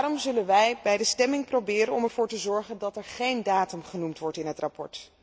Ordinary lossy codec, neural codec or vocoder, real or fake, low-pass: none; none; real; none